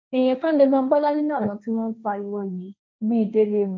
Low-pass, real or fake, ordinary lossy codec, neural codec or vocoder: none; fake; none; codec, 16 kHz, 1.1 kbps, Voila-Tokenizer